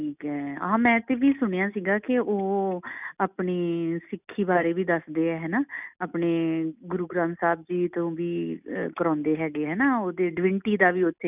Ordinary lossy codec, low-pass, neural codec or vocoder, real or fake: none; 3.6 kHz; none; real